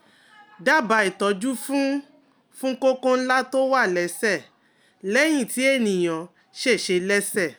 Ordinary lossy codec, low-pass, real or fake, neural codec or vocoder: none; none; real; none